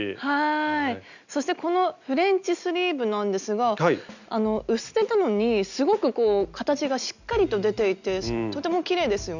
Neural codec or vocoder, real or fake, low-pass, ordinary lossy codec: none; real; 7.2 kHz; none